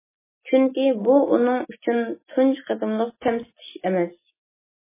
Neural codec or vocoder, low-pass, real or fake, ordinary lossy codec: none; 3.6 kHz; real; MP3, 16 kbps